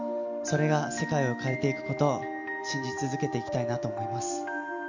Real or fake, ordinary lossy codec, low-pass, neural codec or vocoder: real; none; 7.2 kHz; none